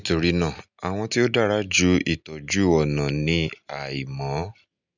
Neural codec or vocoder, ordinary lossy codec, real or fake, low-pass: none; none; real; 7.2 kHz